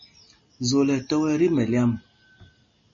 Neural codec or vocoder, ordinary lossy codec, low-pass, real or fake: none; MP3, 32 kbps; 7.2 kHz; real